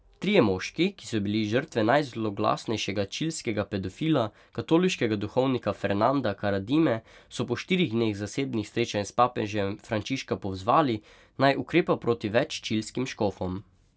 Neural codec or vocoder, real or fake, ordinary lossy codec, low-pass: none; real; none; none